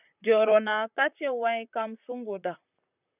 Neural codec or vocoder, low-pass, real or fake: vocoder, 44.1 kHz, 128 mel bands, Pupu-Vocoder; 3.6 kHz; fake